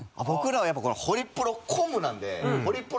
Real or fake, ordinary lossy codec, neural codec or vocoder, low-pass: real; none; none; none